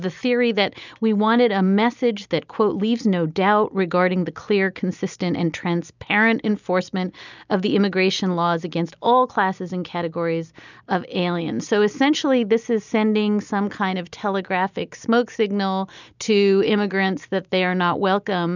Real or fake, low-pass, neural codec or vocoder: real; 7.2 kHz; none